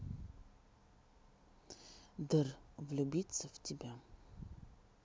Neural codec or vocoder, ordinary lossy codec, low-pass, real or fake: none; none; none; real